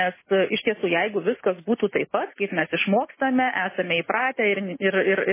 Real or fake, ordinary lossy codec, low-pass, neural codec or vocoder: real; MP3, 16 kbps; 3.6 kHz; none